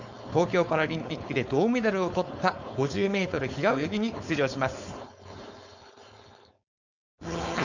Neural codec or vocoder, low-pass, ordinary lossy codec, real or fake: codec, 16 kHz, 4.8 kbps, FACodec; 7.2 kHz; none; fake